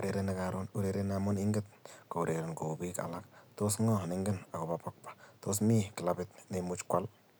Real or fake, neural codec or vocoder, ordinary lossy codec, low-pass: real; none; none; none